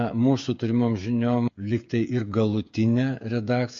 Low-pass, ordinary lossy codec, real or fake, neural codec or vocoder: 7.2 kHz; MP3, 48 kbps; fake; codec, 16 kHz, 8 kbps, FreqCodec, smaller model